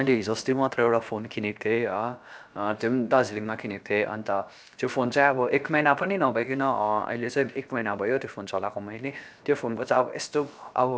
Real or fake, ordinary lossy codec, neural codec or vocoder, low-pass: fake; none; codec, 16 kHz, about 1 kbps, DyCAST, with the encoder's durations; none